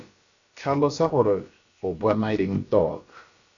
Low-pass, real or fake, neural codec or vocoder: 7.2 kHz; fake; codec, 16 kHz, about 1 kbps, DyCAST, with the encoder's durations